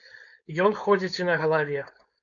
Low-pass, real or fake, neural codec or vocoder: 7.2 kHz; fake; codec, 16 kHz, 4.8 kbps, FACodec